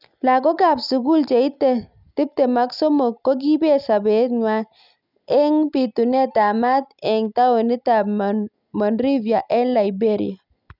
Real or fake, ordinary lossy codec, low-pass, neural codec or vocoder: real; none; 5.4 kHz; none